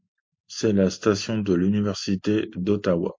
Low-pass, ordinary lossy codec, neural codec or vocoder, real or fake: 7.2 kHz; MP3, 48 kbps; none; real